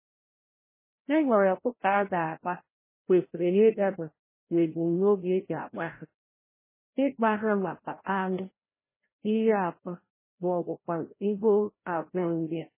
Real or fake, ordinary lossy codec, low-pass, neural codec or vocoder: fake; MP3, 16 kbps; 3.6 kHz; codec, 16 kHz, 0.5 kbps, FreqCodec, larger model